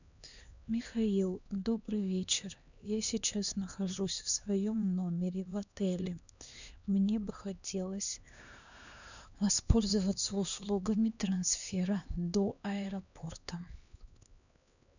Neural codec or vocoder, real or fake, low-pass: codec, 16 kHz, 2 kbps, X-Codec, HuBERT features, trained on LibriSpeech; fake; 7.2 kHz